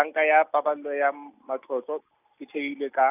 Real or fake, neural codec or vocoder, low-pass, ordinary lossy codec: real; none; 3.6 kHz; none